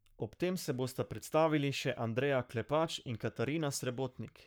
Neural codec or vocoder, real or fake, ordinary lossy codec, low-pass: codec, 44.1 kHz, 7.8 kbps, Pupu-Codec; fake; none; none